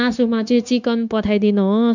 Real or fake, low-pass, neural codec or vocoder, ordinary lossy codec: fake; 7.2 kHz; codec, 16 kHz, 0.9 kbps, LongCat-Audio-Codec; none